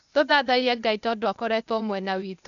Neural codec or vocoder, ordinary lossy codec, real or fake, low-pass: codec, 16 kHz, 0.8 kbps, ZipCodec; none; fake; 7.2 kHz